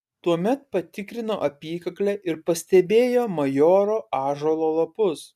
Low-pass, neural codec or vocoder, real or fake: 14.4 kHz; none; real